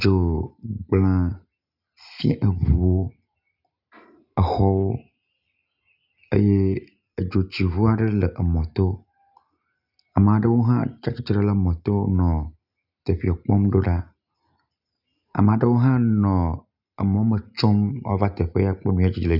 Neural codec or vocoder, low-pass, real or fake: none; 5.4 kHz; real